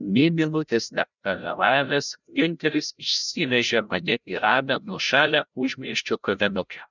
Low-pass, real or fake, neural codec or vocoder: 7.2 kHz; fake; codec, 16 kHz, 0.5 kbps, FreqCodec, larger model